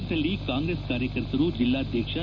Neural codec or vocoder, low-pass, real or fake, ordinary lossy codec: none; 7.2 kHz; real; none